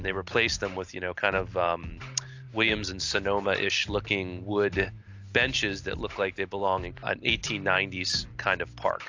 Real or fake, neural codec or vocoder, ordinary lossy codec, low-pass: real; none; AAC, 48 kbps; 7.2 kHz